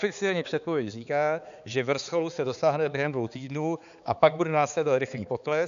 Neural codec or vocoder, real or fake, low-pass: codec, 16 kHz, 4 kbps, X-Codec, HuBERT features, trained on balanced general audio; fake; 7.2 kHz